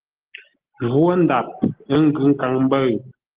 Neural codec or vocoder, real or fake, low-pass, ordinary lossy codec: none; real; 3.6 kHz; Opus, 16 kbps